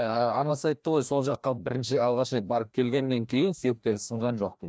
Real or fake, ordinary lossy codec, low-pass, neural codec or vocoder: fake; none; none; codec, 16 kHz, 1 kbps, FreqCodec, larger model